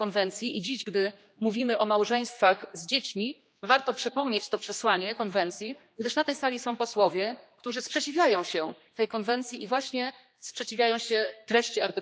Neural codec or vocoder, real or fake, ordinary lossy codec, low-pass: codec, 16 kHz, 2 kbps, X-Codec, HuBERT features, trained on general audio; fake; none; none